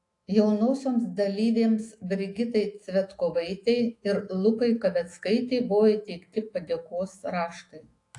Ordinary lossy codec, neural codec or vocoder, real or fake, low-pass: AAC, 48 kbps; autoencoder, 48 kHz, 128 numbers a frame, DAC-VAE, trained on Japanese speech; fake; 10.8 kHz